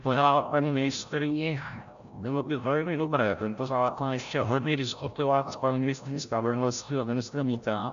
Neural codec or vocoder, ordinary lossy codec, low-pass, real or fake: codec, 16 kHz, 0.5 kbps, FreqCodec, larger model; AAC, 96 kbps; 7.2 kHz; fake